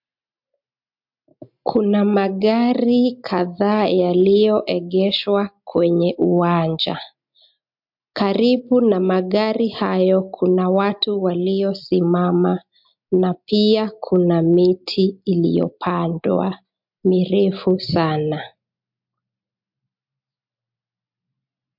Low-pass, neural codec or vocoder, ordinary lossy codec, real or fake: 5.4 kHz; none; MP3, 48 kbps; real